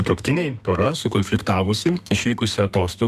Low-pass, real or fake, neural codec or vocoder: 14.4 kHz; fake; codec, 32 kHz, 1.9 kbps, SNAC